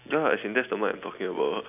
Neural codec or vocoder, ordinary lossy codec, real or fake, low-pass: none; none; real; 3.6 kHz